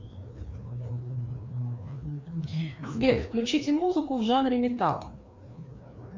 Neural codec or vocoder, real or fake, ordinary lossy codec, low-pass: codec, 16 kHz, 2 kbps, FreqCodec, larger model; fake; MP3, 64 kbps; 7.2 kHz